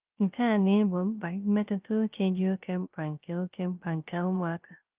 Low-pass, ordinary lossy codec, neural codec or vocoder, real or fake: 3.6 kHz; Opus, 32 kbps; codec, 16 kHz, 0.3 kbps, FocalCodec; fake